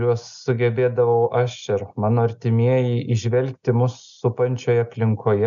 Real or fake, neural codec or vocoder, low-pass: real; none; 7.2 kHz